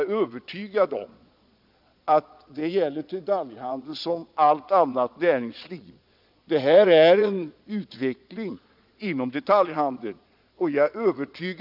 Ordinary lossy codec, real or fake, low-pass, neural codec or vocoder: none; fake; 5.4 kHz; vocoder, 22.05 kHz, 80 mel bands, Vocos